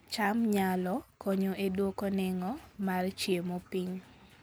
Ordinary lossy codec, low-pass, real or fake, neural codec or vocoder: none; none; real; none